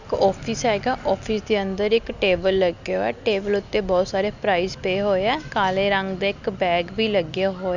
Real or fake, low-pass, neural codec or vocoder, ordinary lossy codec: real; 7.2 kHz; none; none